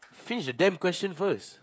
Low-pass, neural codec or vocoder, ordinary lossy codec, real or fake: none; codec, 16 kHz, 16 kbps, FreqCodec, smaller model; none; fake